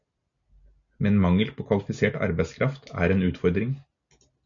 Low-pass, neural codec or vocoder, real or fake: 7.2 kHz; none; real